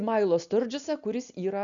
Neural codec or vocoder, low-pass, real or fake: none; 7.2 kHz; real